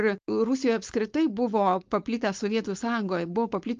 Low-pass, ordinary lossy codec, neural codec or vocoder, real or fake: 7.2 kHz; Opus, 32 kbps; codec, 16 kHz, 4.8 kbps, FACodec; fake